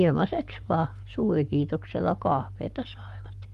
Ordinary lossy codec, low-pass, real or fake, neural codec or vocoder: Opus, 32 kbps; 14.4 kHz; fake; codec, 44.1 kHz, 7.8 kbps, Pupu-Codec